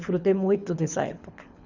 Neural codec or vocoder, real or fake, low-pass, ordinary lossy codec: codec, 24 kHz, 6 kbps, HILCodec; fake; 7.2 kHz; none